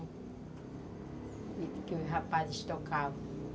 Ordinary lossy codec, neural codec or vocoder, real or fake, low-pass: none; none; real; none